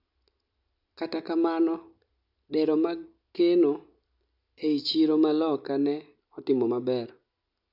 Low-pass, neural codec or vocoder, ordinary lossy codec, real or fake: 5.4 kHz; none; none; real